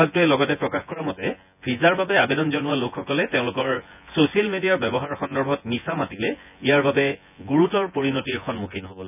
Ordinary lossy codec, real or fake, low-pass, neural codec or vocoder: none; fake; 3.6 kHz; vocoder, 24 kHz, 100 mel bands, Vocos